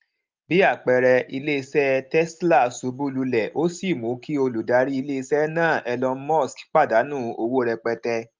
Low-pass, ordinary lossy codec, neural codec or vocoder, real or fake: 7.2 kHz; Opus, 24 kbps; none; real